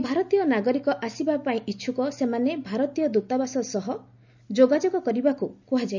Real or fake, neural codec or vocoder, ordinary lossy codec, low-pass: real; none; none; 7.2 kHz